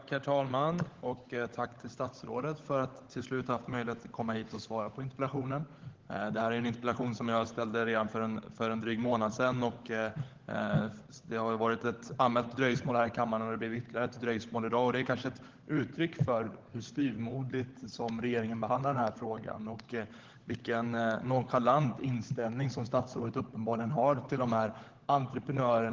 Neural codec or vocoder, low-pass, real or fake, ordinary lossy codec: codec, 16 kHz, 16 kbps, FunCodec, trained on LibriTTS, 50 frames a second; 7.2 kHz; fake; Opus, 16 kbps